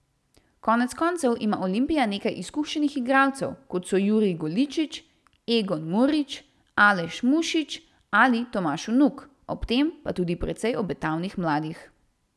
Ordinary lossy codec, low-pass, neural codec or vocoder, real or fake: none; none; none; real